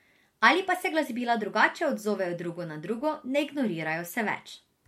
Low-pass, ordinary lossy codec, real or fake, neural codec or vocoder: 19.8 kHz; MP3, 64 kbps; real; none